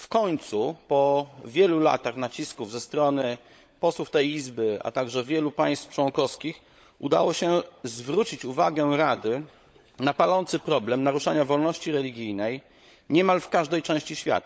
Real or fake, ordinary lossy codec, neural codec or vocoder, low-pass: fake; none; codec, 16 kHz, 16 kbps, FunCodec, trained on LibriTTS, 50 frames a second; none